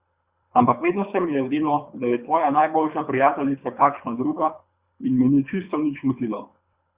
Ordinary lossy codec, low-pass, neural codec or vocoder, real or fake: Opus, 64 kbps; 3.6 kHz; codec, 24 kHz, 3 kbps, HILCodec; fake